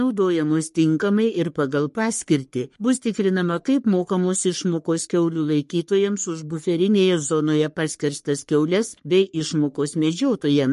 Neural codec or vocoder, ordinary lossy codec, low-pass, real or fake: codec, 44.1 kHz, 3.4 kbps, Pupu-Codec; MP3, 48 kbps; 14.4 kHz; fake